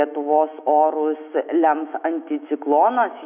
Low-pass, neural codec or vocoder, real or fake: 3.6 kHz; autoencoder, 48 kHz, 128 numbers a frame, DAC-VAE, trained on Japanese speech; fake